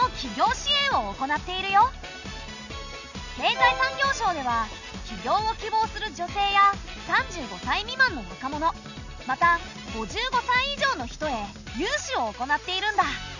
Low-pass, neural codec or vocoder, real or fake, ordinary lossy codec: 7.2 kHz; none; real; none